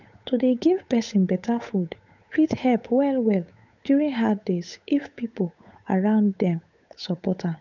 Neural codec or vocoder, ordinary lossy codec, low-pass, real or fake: codec, 16 kHz, 8 kbps, FunCodec, trained on Chinese and English, 25 frames a second; none; 7.2 kHz; fake